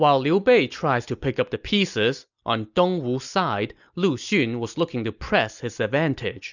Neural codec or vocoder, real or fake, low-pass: none; real; 7.2 kHz